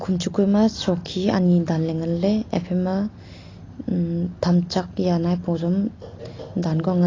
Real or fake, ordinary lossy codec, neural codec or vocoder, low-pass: real; AAC, 32 kbps; none; 7.2 kHz